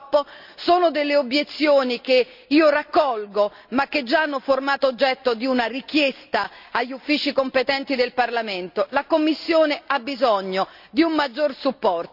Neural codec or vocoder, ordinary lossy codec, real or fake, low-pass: none; none; real; 5.4 kHz